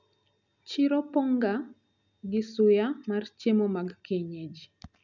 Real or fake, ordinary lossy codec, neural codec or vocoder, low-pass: real; none; none; 7.2 kHz